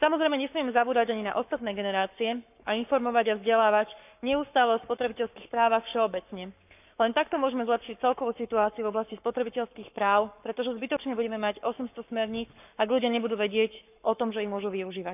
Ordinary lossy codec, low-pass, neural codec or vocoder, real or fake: none; 3.6 kHz; codec, 44.1 kHz, 7.8 kbps, Pupu-Codec; fake